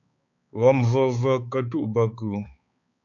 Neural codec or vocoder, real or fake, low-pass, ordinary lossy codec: codec, 16 kHz, 4 kbps, X-Codec, HuBERT features, trained on balanced general audio; fake; 7.2 kHz; AAC, 64 kbps